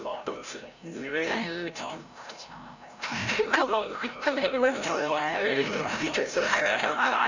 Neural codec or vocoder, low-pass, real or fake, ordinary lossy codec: codec, 16 kHz, 0.5 kbps, FreqCodec, larger model; 7.2 kHz; fake; none